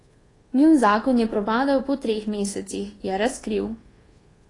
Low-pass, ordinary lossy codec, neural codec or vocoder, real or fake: 10.8 kHz; AAC, 32 kbps; codec, 24 kHz, 1.2 kbps, DualCodec; fake